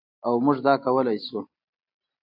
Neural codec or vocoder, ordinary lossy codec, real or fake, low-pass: none; AAC, 48 kbps; real; 5.4 kHz